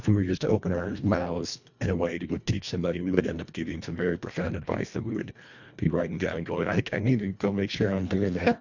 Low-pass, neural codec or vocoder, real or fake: 7.2 kHz; codec, 24 kHz, 1.5 kbps, HILCodec; fake